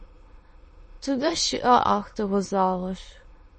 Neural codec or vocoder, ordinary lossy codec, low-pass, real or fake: autoencoder, 22.05 kHz, a latent of 192 numbers a frame, VITS, trained on many speakers; MP3, 32 kbps; 9.9 kHz; fake